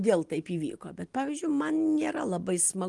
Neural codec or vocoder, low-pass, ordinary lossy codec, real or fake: none; 10.8 kHz; Opus, 24 kbps; real